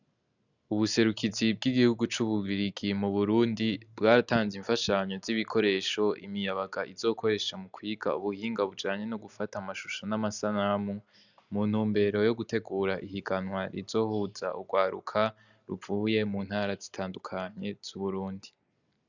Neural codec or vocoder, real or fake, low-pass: none; real; 7.2 kHz